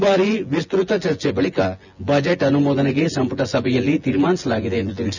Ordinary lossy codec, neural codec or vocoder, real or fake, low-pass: none; vocoder, 24 kHz, 100 mel bands, Vocos; fake; 7.2 kHz